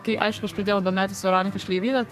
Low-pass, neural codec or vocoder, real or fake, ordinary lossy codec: 14.4 kHz; codec, 32 kHz, 1.9 kbps, SNAC; fake; AAC, 96 kbps